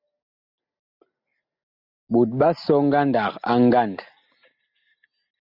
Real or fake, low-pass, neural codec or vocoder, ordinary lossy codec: real; 5.4 kHz; none; Opus, 64 kbps